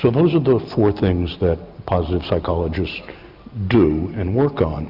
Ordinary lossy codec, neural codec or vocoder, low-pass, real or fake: Opus, 64 kbps; none; 5.4 kHz; real